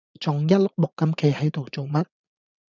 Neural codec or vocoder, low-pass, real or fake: vocoder, 44.1 kHz, 80 mel bands, Vocos; 7.2 kHz; fake